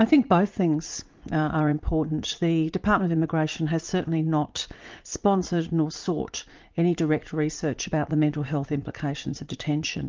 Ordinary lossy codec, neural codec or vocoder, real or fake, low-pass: Opus, 24 kbps; vocoder, 44.1 kHz, 80 mel bands, Vocos; fake; 7.2 kHz